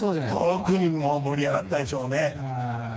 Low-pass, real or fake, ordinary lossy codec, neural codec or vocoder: none; fake; none; codec, 16 kHz, 2 kbps, FreqCodec, smaller model